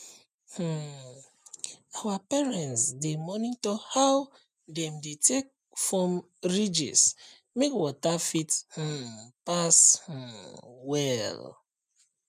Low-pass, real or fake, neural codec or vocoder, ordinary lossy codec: 14.4 kHz; real; none; none